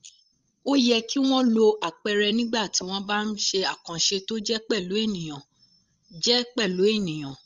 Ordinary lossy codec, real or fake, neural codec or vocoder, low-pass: Opus, 32 kbps; fake; codec, 16 kHz, 16 kbps, FreqCodec, larger model; 7.2 kHz